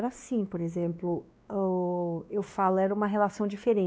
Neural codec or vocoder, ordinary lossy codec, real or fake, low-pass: codec, 16 kHz, 2 kbps, X-Codec, WavLM features, trained on Multilingual LibriSpeech; none; fake; none